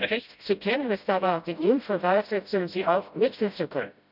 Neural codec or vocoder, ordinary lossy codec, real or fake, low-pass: codec, 16 kHz, 0.5 kbps, FreqCodec, smaller model; none; fake; 5.4 kHz